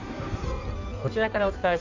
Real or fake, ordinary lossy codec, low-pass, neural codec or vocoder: fake; none; 7.2 kHz; codec, 16 kHz in and 24 kHz out, 1.1 kbps, FireRedTTS-2 codec